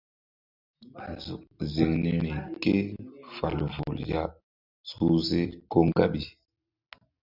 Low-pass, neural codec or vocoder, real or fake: 5.4 kHz; none; real